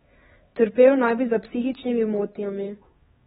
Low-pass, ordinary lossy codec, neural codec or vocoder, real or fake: 7.2 kHz; AAC, 16 kbps; codec, 16 kHz, 4 kbps, X-Codec, HuBERT features, trained on LibriSpeech; fake